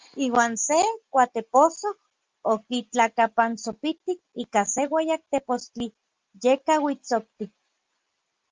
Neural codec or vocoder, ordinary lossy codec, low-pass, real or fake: none; Opus, 24 kbps; 7.2 kHz; real